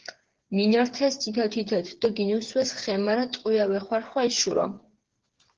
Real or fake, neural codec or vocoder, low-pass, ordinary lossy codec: fake; codec, 16 kHz, 8 kbps, FreqCodec, smaller model; 7.2 kHz; Opus, 16 kbps